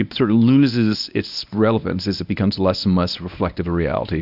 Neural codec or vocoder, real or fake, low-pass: codec, 24 kHz, 0.9 kbps, WavTokenizer, medium speech release version 1; fake; 5.4 kHz